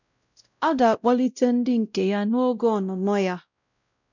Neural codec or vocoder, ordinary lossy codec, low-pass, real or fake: codec, 16 kHz, 0.5 kbps, X-Codec, WavLM features, trained on Multilingual LibriSpeech; none; 7.2 kHz; fake